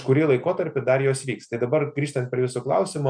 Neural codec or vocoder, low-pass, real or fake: none; 9.9 kHz; real